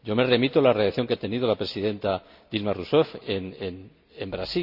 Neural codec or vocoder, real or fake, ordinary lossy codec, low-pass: none; real; none; 5.4 kHz